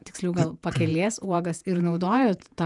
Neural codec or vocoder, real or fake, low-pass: vocoder, 48 kHz, 128 mel bands, Vocos; fake; 10.8 kHz